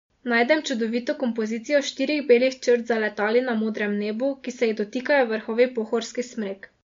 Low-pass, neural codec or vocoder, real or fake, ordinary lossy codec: 7.2 kHz; none; real; MP3, 48 kbps